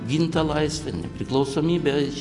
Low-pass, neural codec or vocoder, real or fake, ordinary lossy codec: 10.8 kHz; none; real; AAC, 64 kbps